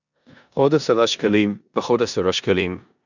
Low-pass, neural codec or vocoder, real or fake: 7.2 kHz; codec, 16 kHz in and 24 kHz out, 0.9 kbps, LongCat-Audio-Codec, four codebook decoder; fake